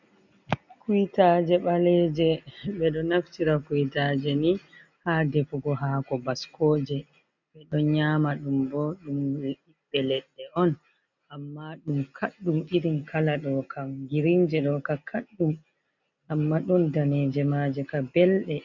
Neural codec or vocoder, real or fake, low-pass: none; real; 7.2 kHz